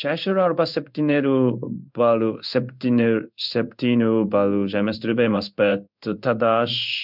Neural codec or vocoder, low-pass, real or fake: codec, 16 kHz in and 24 kHz out, 1 kbps, XY-Tokenizer; 5.4 kHz; fake